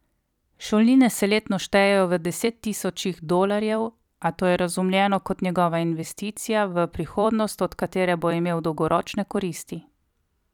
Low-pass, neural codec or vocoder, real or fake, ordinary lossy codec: 19.8 kHz; vocoder, 44.1 kHz, 128 mel bands every 256 samples, BigVGAN v2; fake; none